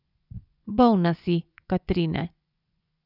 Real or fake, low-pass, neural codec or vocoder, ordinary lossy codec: fake; 5.4 kHz; vocoder, 24 kHz, 100 mel bands, Vocos; none